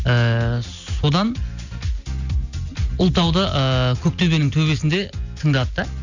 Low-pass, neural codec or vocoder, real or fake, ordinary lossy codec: 7.2 kHz; none; real; none